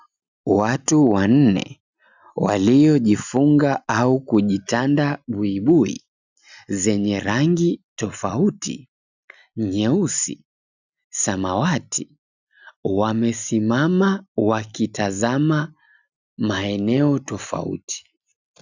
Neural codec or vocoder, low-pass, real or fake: none; 7.2 kHz; real